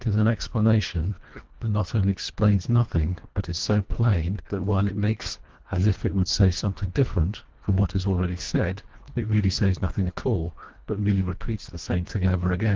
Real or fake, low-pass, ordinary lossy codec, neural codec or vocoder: fake; 7.2 kHz; Opus, 16 kbps; codec, 24 kHz, 1.5 kbps, HILCodec